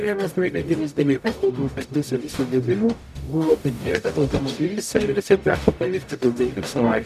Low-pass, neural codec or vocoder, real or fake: 14.4 kHz; codec, 44.1 kHz, 0.9 kbps, DAC; fake